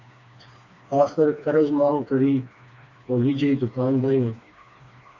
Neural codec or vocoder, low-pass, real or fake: codec, 16 kHz, 2 kbps, FreqCodec, smaller model; 7.2 kHz; fake